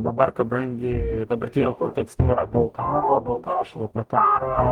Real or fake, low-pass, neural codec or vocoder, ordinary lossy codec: fake; 19.8 kHz; codec, 44.1 kHz, 0.9 kbps, DAC; Opus, 24 kbps